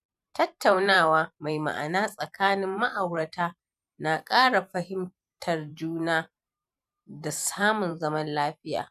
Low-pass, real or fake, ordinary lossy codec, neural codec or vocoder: 14.4 kHz; fake; none; vocoder, 44.1 kHz, 128 mel bands every 512 samples, BigVGAN v2